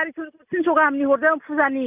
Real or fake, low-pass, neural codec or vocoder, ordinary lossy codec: real; 3.6 kHz; none; none